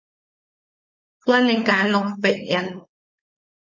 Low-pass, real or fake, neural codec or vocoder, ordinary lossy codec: 7.2 kHz; fake; codec, 16 kHz, 4.8 kbps, FACodec; MP3, 32 kbps